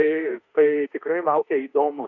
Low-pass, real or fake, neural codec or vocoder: 7.2 kHz; fake; autoencoder, 48 kHz, 32 numbers a frame, DAC-VAE, trained on Japanese speech